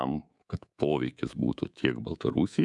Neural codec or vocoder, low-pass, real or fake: codec, 24 kHz, 3.1 kbps, DualCodec; 10.8 kHz; fake